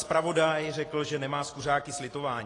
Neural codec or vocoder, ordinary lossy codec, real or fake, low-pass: none; AAC, 32 kbps; real; 10.8 kHz